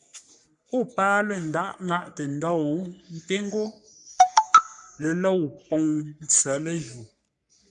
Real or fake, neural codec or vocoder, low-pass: fake; codec, 44.1 kHz, 3.4 kbps, Pupu-Codec; 10.8 kHz